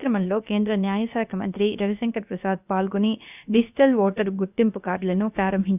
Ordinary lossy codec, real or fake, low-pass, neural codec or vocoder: none; fake; 3.6 kHz; codec, 16 kHz, about 1 kbps, DyCAST, with the encoder's durations